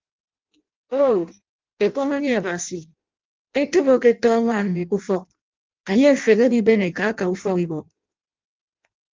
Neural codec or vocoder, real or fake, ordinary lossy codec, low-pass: codec, 16 kHz in and 24 kHz out, 0.6 kbps, FireRedTTS-2 codec; fake; Opus, 32 kbps; 7.2 kHz